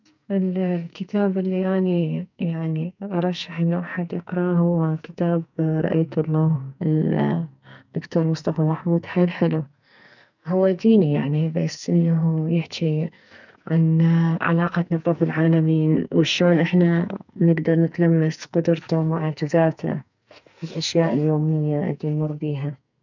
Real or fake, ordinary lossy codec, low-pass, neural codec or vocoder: fake; none; 7.2 kHz; codec, 44.1 kHz, 2.6 kbps, SNAC